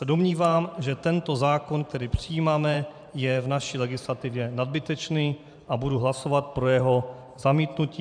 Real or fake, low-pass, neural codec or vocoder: fake; 9.9 kHz; vocoder, 44.1 kHz, 128 mel bands every 512 samples, BigVGAN v2